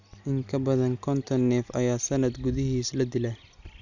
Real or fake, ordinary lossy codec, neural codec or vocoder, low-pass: real; none; none; 7.2 kHz